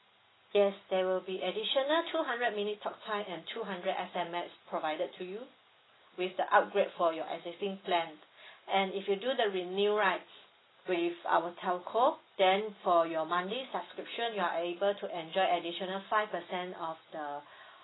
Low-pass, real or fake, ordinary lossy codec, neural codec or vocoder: 7.2 kHz; real; AAC, 16 kbps; none